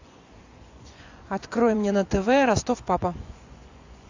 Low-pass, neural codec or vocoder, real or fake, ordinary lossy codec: 7.2 kHz; vocoder, 24 kHz, 100 mel bands, Vocos; fake; AAC, 48 kbps